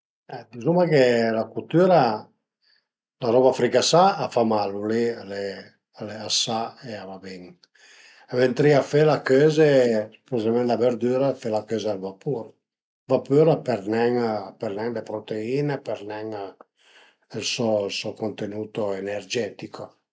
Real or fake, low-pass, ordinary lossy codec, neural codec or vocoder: real; none; none; none